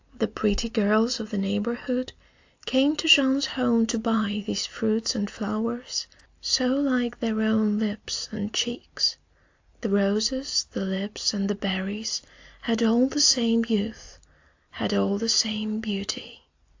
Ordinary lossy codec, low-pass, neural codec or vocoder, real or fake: AAC, 48 kbps; 7.2 kHz; none; real